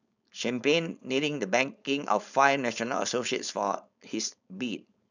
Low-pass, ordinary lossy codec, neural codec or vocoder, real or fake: 7.2 kHz; none; codec, 16 kHz, 4.8 kbps, FACodec; fake